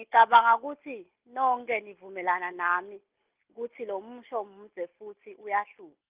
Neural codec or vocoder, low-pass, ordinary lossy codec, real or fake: none; 3.6 kHz; Opus, 24 kbps; real